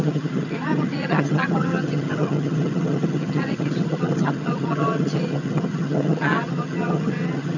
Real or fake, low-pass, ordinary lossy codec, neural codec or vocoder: fake; 7.2 kHz; none; vocoder, 22.05 kHz, 80 mel bands, HiFi-GAN